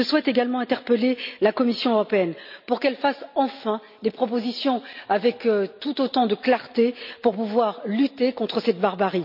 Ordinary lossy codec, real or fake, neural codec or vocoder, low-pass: none; real; none; 5.4 kHz